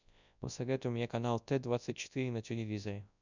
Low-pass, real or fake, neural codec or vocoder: 7.2 kHz; fake; codec, 24 kHz, 0.9 kbps, WavTokenizer, large speech release